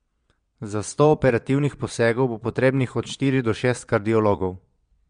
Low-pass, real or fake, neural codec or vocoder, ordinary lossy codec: 9.9 kHz; fake; vocoder, 22.05 kHz, 80 mel bands, Vocos; MP3, 64 kbps